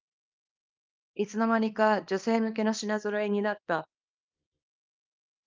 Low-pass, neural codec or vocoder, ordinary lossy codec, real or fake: 7.2 kHz; codec, 24 kHz, 0.9 kbps, WavTokenizer, small release; Opus, 24 kbps; fake